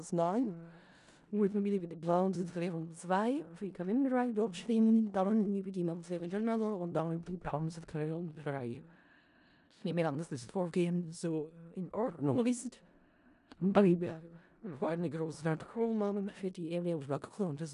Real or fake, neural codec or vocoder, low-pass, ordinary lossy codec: fake; codec, 16 kHz in and 24 kHz out, 0.4 kbps, LongCat-Audio-Codec, four codebook decoder; 10.8 kHz; none